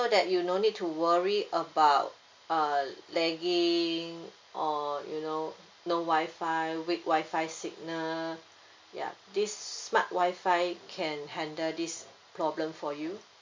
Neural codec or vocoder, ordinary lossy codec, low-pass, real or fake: none; MP3, 64 kbps; 7.2 kHz; real